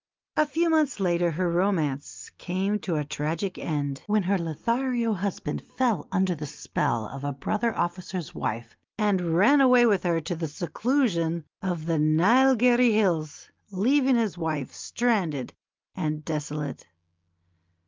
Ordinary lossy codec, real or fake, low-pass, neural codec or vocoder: Opus, 24 kbps; real; 7.2 kHz; none